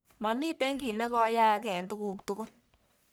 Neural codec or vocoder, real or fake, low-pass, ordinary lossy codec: codec, 44.1 kHz, 1.7 kbps, Pupu-Codec; fake; none; none